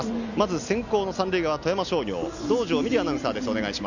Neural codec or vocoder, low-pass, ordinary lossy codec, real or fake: none; 7.2 kHz; none; real